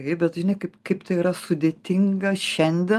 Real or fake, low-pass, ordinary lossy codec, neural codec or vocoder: real; 14.4 kHz; Opus, 32 kbps; none